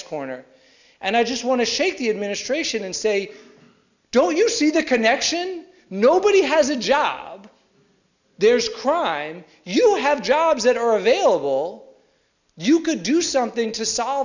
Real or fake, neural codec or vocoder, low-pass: real; none; 7.2 kHz